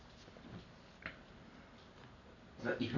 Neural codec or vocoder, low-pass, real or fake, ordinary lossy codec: none; 7.2 kHz; real; none